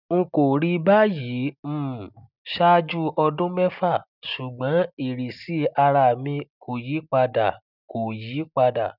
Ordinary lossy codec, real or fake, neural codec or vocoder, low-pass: none; real; none; 5.4 kHz